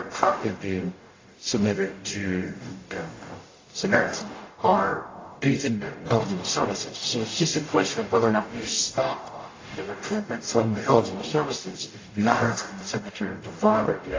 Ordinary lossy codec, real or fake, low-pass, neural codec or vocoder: AAC, 32 kbps; fake; 7.2 kHz; codec, 44.1 kHz, 0.9 kbps, DAC